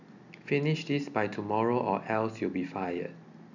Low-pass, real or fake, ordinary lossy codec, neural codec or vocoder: 7.2 kHz; real; none; none